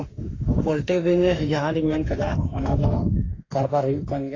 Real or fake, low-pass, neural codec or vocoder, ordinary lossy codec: fake; 7.2 kHz; autoencoder, 48 kHz, 32 numbers a frame, DAC-VAE, trained on Japanese speech; AAC, 32 kbps